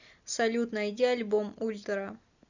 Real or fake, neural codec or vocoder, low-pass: real; none; 7.2 kHz